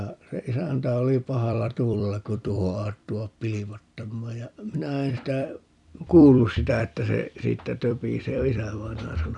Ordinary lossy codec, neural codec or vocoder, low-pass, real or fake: none; vocoder, 44.1 kHz, 128 mel bands every 256 samples, BigVGAN v2; 10.8 kHz; fake